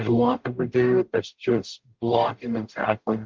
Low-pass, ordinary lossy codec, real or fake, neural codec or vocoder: 7.2 kHz; Opus, 32 kbps; fake; codec, 44.1 kHz, 0.9 kbps, DAC